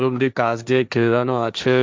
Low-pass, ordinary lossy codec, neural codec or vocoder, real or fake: none; none; codec, 16 kHz, 1.1 kbps, Voila-Tokenizer; fake